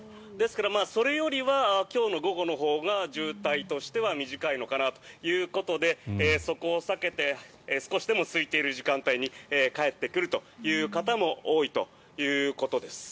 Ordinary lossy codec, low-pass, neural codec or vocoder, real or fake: none; none; none; real